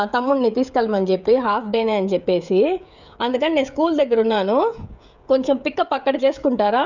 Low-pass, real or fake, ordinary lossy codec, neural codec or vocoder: 7.2 kHz; fake; none; codec, 16 kHz, 16 kbps, FreqCodec, smaller model